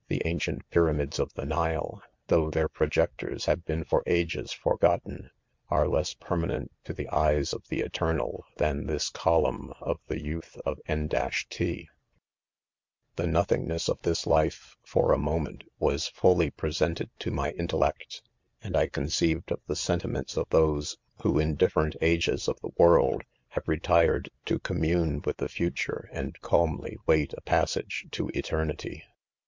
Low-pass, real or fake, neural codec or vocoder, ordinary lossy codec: 7.2 kHz; real; none; MP3, 64 kbps